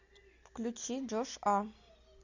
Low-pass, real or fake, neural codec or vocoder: 7.2 kHz; real; none